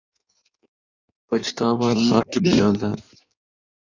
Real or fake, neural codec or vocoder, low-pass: fake; codec, 16 kHz in and 24 kHz out, 1.1 kbps, FireRedTTS-2 codec; 7.2 kHz